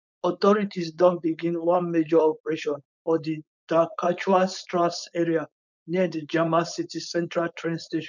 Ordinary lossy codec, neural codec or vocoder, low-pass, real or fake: none; codec, 16 kHz, 4.8 kbps, FACodec; 7.2 kHz; fake